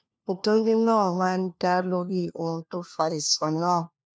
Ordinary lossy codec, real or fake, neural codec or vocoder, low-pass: none; fake; codec, 16 kHz, 1 kbps, FunCodec, trained on LibriTTS, 50 frames a second; none